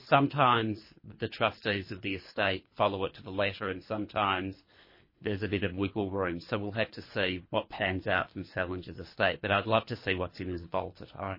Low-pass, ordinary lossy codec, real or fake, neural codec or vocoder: 5.4 kHz; MP3, 24 kbps; fake; codec, 24 kHz, 3 kbps, HILCodec